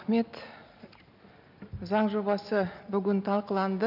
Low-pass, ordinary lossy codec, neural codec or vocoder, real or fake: 5.4 kHz; none; none; real